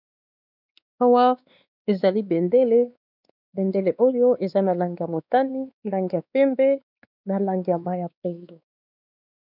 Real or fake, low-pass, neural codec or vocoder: fake; 5.4 kHz; codec, 16 kHz, 2 kbps, X-Codec, WavLM features, trained on Multilingual LibriSpeech